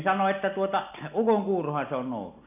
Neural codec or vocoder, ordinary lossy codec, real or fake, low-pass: none; none; real; 3.6 kHz